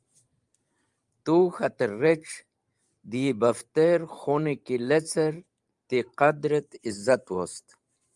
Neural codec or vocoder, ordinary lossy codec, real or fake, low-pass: vocoder, 44.1 kHz, 128 mel bands every 512 samples, BigVGAN v2; Opus, 32 kbps; fake; 10.8 kHz